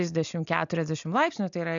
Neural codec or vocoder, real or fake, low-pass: none; real; 7.2 kHz